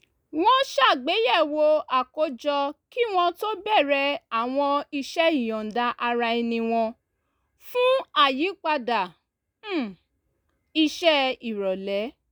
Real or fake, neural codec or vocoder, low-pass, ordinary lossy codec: real; none; none; none